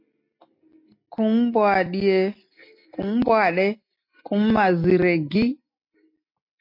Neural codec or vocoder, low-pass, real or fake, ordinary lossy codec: none; 5.4 kHz; real; MP3, 32 kbps